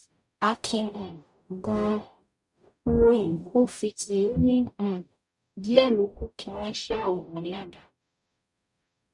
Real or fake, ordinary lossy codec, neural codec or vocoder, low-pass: fake; none; codec, 44.1 kHz, 0.9 kbps, DAC; 10.8 kHz